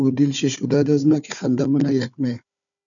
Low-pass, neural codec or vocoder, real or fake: 7.2 kHz; codec, 16 kHz, 4 kbps, FunCodec, trained on Chinese and English, 50 frames a second; fake